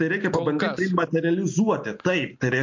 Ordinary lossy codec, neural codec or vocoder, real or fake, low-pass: MP3, 48 kbps; none; real; 7.2 kHz